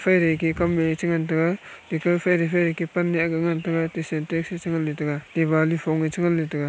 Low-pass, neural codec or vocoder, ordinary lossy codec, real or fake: none; none; none; real